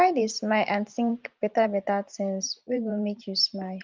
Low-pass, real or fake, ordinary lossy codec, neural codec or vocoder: 7.2 kHz; fake; Opus, 32 kbps; vocoder, 44.1 kHz, 128 mel bands every 512 samples, BigVGAN v2